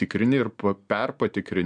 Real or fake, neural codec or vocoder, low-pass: real; none; 9.9 kHz